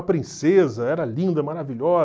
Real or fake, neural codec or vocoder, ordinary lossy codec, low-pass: real; none; none; none